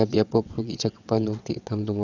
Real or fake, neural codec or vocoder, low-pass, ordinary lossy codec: fake; vocoder, 22.05 kHz, 80 mel bands, WaveNeXt; 7.2 kHz; none